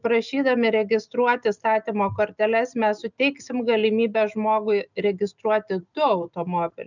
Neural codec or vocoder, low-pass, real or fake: none; 7.2 kHz; real